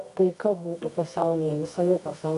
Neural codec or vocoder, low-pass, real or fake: codec, 24 kHz, 0.9 kbps, WavTokenizer, medium music audio release; 10.8 kHz; fake